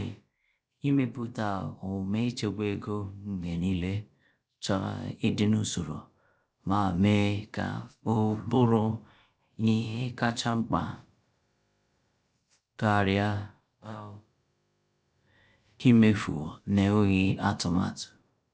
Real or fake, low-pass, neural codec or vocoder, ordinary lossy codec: fake; none; codec, 16 kHz, about 1 kbps, DyCAST, with the encoder's durations; none